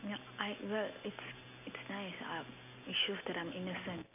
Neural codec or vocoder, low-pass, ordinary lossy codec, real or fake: none; 3.6 kHz; none; real